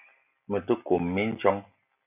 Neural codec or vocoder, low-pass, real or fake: none; 3.6 kHz; real